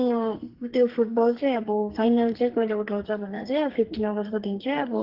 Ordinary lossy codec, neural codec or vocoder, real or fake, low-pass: Opus, 16 kbps; codec, 44.1 kHz, 2.6 kbps, SNAC; fake; 5.4 kHz